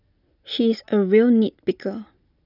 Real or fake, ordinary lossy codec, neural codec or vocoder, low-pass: real; none; none; 5.4 kHz